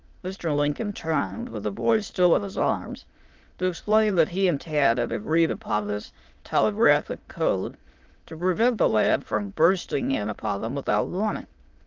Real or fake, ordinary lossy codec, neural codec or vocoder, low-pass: fake; Opus, 32 kbps; autoencoder, 22.05 kHz, a latent of 192 numbers a frame, VITS, trained on many speakers; 7.2 kHz